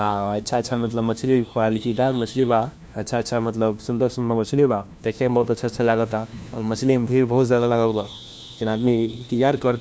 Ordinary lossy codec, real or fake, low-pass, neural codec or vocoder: none; fake; none; codec, 16 kHz, 1 kbps, FunCodec, trained on LibriTTS, 50 frames a second